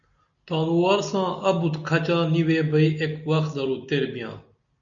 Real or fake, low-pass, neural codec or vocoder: real; 7.2 kHz; none